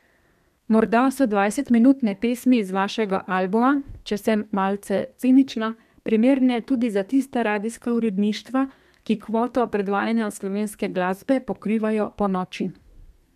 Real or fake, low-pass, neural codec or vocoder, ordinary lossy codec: fake; 14.4 kHz; codec, 32 kHz, 1.9 kbps, SNAC; MP3, 96 kbps